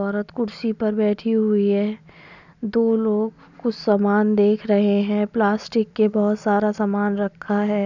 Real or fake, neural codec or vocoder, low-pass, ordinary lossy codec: real; none; 7.2 kHz; none